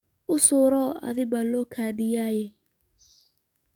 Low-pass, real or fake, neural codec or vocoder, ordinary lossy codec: 19.8 kHz; fake; codec, 44.1 kHz, 7.8 kbps, DAC; none